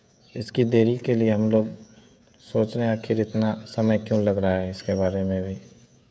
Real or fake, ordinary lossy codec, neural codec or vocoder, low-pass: fake; none; codec, 16 kHz, 16 kbps, FreqCodec, smaller model; none